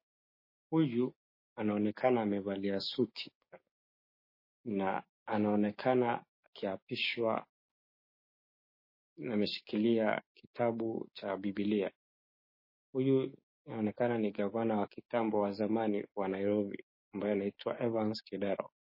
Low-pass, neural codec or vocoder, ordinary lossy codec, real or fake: 5.4 kHz; codec, 16 kHz, 6 kbps, DAC; MP3, 24 kbps; fake